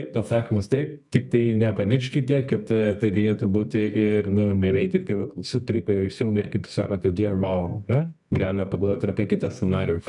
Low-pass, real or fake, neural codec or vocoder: 10.8 kHz; fake; codec, 24 kHz, 0.9 kbps, WavTokenizer, medium music audio release